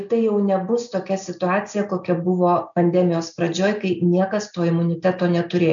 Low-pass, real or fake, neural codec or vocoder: 7.2 kHz; real; none